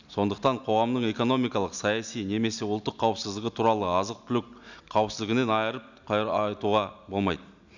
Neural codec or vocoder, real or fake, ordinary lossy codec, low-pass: none; real; none; 7.2 kHz